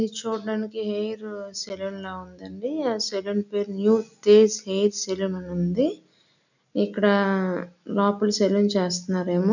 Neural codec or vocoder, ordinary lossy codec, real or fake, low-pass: none; none; real; 7.2 kHz